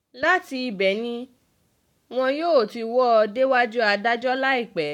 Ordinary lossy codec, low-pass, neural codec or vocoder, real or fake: none; 19.8 kHz; codec, 44.1 kHz, 7.8 kbps, Pupu-Codec; fake